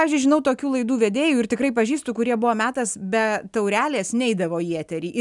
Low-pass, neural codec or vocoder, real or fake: 10.8 kHz; none; real